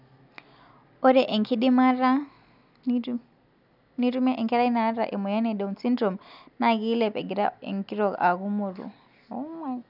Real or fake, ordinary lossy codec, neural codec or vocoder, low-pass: real; none; none; 5.4 kHz